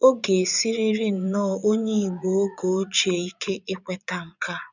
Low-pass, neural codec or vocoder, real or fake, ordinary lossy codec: 7.2 kHz; vocoder, 22.05 kHz, 80 mel bands, Vocos; fake; none